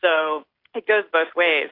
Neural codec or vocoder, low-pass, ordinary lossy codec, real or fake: none; 5.4 kHz; AAC, 32 kbps; real